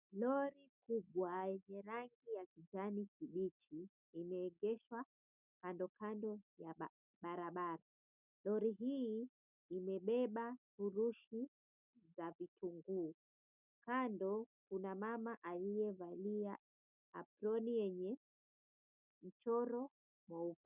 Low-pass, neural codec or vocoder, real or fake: 3.6 kHz; none; real